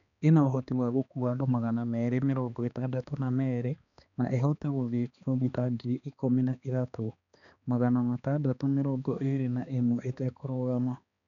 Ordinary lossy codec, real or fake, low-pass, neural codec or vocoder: none; fake; 7.2 kHz; codec, 16 kHz, 2 kbps, X-Codec, HuBERT features, trained on balanced general audio